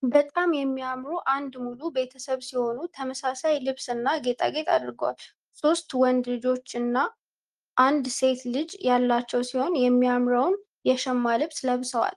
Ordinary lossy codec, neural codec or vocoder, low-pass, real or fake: Opus, 24 kbps; none; 10.8 kHz; real